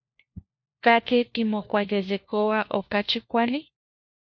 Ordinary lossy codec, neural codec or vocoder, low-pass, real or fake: MP3, 48 kbps; codec, 16 kHz, 1 kbps, FunCodec, trained on LibriTTS, 50 frames a second; 7.2 kHz; fake